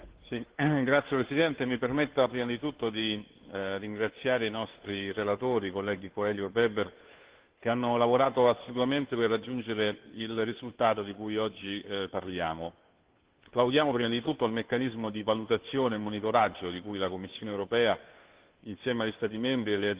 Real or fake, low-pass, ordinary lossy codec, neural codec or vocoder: fake; 3.6 kHz; Opus, 16 kbps; codec, 16 kHz, 2 kbps, FunCodec, trained on Chinese and English, 25 frames a second